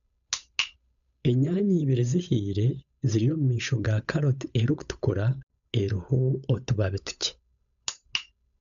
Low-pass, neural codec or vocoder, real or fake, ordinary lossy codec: 7.2 kHz; codec, 16 kHz, 8 kbps, FunCodec, trained on Chinese and English, 25 frames a second; fake; none